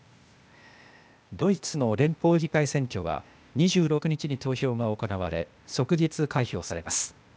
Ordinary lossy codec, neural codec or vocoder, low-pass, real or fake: none; codec, 16 kHz, 0.8 kbps, ZipCodec; none; fake